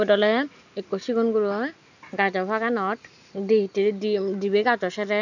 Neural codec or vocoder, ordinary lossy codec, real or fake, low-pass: none; none; real; 7.2 kHz